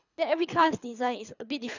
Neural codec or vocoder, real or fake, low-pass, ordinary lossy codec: codec, 24 kHz, 3 kbps, HILCodec; fake; 7.2 kHz; none